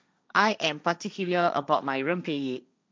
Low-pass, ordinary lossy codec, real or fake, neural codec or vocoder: none; none; fake; codec, 16 kHz, 1.1 kbps, Voila-Tokenizer